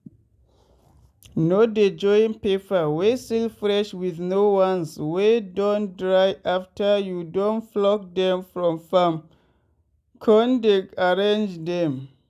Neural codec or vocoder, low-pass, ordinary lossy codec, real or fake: none; 14.4 kHz; none; real